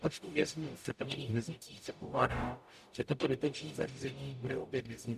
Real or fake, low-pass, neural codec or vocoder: fake; 14.4 kHz; codec, 44.1 kHz, 0.9 kbps, DAC